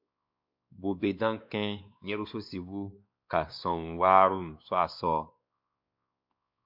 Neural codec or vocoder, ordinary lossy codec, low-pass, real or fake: codec, 16 kHz, 2 kbps, X-Codec, WavLM features, trained on Multilingual LibriSpeech; MP3, 48 kbps; 5.4 kHz; fake